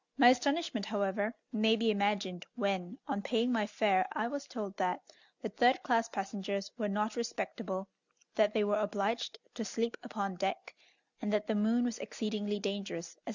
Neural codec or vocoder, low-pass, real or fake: none; 7.2 kHz; real